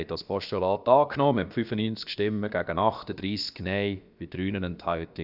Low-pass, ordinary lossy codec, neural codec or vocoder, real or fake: 5.4 kHz; none; codec, 16 kHz, about 1 kbps, DyCAST, with the encoder's durations; fake